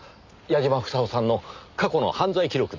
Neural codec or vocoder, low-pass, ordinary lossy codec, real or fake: none; 7.2 kHz; MP3, 64 kbps; real